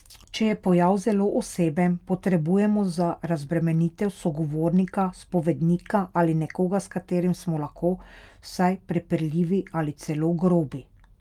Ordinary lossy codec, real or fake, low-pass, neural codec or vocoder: Opus, 32 kbps; real; 19.8 kHz; none